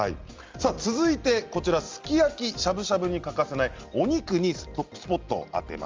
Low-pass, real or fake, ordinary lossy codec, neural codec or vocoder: 7.2 kHz; real; Opus, 32 kbps; none